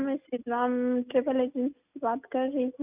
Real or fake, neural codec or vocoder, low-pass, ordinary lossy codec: real; none; 3.6 kHz; none